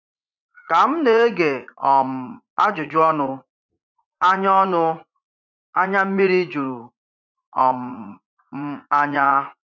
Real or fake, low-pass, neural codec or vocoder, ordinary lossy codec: fake; 7.2 kHz; vocoder, 44.1 kHz, 80 mel bands, Vocos; none